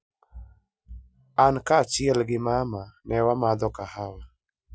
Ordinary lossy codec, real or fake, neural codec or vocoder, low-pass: none; real; none; none